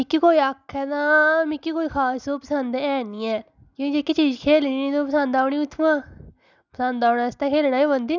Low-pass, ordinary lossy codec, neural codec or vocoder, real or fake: 7.2 kHz; none; none; real